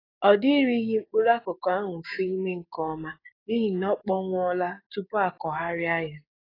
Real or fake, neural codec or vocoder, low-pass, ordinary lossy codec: fake; codec, 16 kHz, 6 kbps, DAC; 5.4 kHz; AAC, 24 kbps